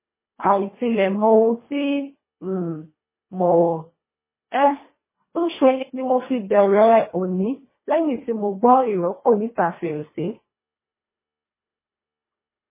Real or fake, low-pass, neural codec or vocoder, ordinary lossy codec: fake; 3.6 kHz; codec, 24 kHz, 1.5 kbps, HILCodec; MP3, 16 kbps